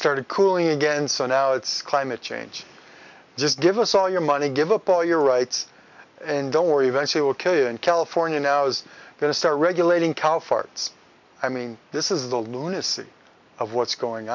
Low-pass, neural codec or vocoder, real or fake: 7.2 kHz; none; real